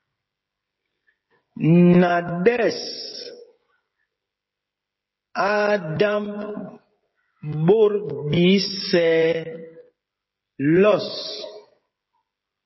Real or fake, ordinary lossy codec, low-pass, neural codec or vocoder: fake; MP3, 24 kbps; 7.2 kHz; codec, 16 kHz, 16 kbps, FreqCodec, smaller model